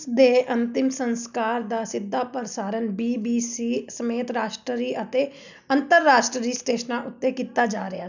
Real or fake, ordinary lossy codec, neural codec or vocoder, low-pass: real; none; none; 7.2 kHz